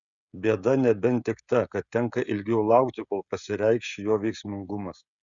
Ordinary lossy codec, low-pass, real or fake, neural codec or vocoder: Opus, 64 kbps; 7.2 kHz; fake; codec, 16 kHz, 16 kbps, FreqCodec, smaller model